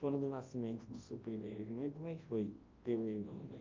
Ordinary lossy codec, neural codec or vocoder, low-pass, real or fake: Opus, 24 kbps; codec, 24 kHz, 0.9 kbps, WavTokenizer, large speech release; 7.2 kHz; fake